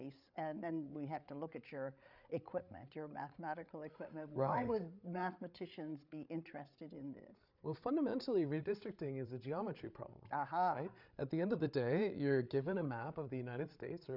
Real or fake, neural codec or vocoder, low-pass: fake; codec, 16 kHz, 8 kbps, FreqCodec, larger model; 5.4 kHz